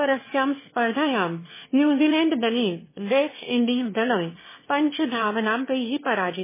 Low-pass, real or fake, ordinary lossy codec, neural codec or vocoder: 3.6 kHz; fake; MP3, 16 kbps; autoencoder, 22.05 kHz, a latent of 192 numbers a frame, VITS, trained on one speaker